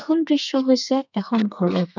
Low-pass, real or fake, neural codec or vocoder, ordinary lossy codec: 7.2 kHz; fake; codec, 32 kHz, 1.9 kbps, SNAC; none